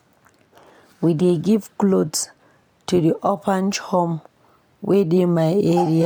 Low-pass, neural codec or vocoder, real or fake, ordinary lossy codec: none; none; real; none